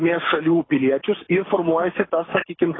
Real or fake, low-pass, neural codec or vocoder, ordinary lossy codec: fake; 7.2 kHz; codec, 24 kHz, 6 kbps, HILCodec; AAC, 16 kbps